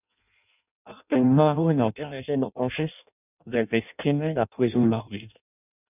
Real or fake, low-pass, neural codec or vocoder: fake; 3.6 kHz; codec, 16 kHz in and 24 kHz out, 0.6 kbps, FireRedTTS-2 codec